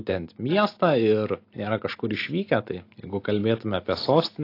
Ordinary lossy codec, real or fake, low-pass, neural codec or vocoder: AAC, 32 kbps; real; 5.4 kHz; none